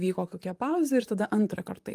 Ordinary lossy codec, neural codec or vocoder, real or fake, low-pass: Opus, 32 kbps; vocoder, 44.1 kHz, 128 mel bands, Pupu-Vocoder; fake; 14.4 kHz